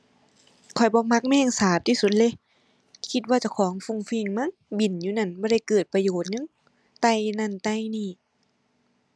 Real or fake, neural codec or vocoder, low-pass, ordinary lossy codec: real; none; none; none